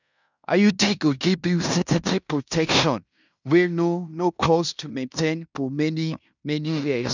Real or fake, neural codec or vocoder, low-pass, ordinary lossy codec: fake; codec, 16 kHz in and 24 kHz out, 0.9 kbps, LongCat-Audio-Codec, fine tuned four codebook decoder; 7.2 kHz; none